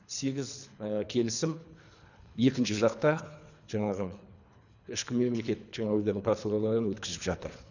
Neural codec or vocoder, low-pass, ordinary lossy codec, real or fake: codec, 24 kHz, 3 kbps, HILCodec; 7.2 kHz; none; fake